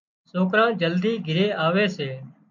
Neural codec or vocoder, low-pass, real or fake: none; 7.2 kHz; real